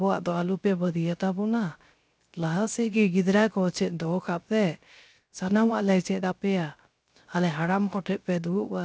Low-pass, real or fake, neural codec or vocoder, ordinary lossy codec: none; fake; codec, 16 kHz, 0.3 kbps, FocalCodec; none